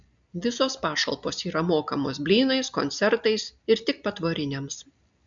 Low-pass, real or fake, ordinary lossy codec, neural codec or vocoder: 7.2 kHz; real; MP3, 64 kbps; none